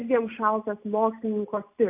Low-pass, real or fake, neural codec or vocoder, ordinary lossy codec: 3.6 kHz; real; none; AAC, 32 kbps